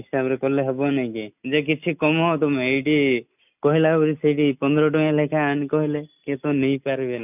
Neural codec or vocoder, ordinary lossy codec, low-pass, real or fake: none; none; 3.6 kHz; real